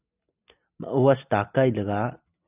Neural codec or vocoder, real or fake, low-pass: none; real; 3.6 kHz